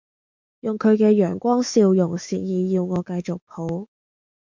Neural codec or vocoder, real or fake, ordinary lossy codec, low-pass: autoencoder, 48 kHz, 128 numbers a frame, DAC-VAE, trained on Japanese speech; fake; MP3, 64 kbps; 7.2 kHz